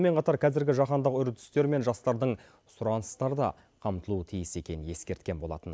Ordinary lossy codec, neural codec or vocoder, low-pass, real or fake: none; none; none; real